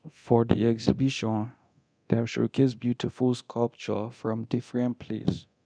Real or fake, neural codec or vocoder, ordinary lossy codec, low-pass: fake; codec, 24 kHz, 0.9 kbps, DualCodec; none; 9.9 kHz